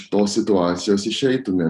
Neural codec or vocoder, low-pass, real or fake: none; 10.8 kHz; real